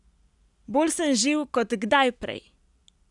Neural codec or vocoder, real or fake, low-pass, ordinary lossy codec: none; real; 10.8 kHz; none